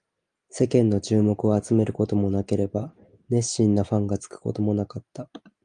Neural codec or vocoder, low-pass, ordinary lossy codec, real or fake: none; 9.9 kHz; Opus, 32 kbps; real